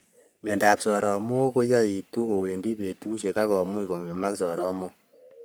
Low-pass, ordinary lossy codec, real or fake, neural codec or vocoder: none; none; fake; codec, 44.1 kHz, 3.4 kbps, Pupu-Codec